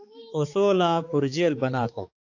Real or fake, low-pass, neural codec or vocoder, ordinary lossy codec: fake; 7.2 kHz; codec, 16 kHz, 4 kbps, X-Codec, HuBERT features, trained on balanced general audio; AAC, 48 kbps